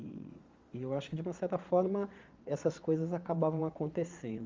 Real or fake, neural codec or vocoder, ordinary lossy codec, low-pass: fake; vocoder, 22.05 kHz, 80 mel bands, Vocos; Opus, 32 kbps; 7.2 kHz